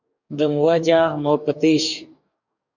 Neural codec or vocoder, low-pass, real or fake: codec, 44.1 kHz, 2.6 kbps, DAC; 7.2 kHz; fake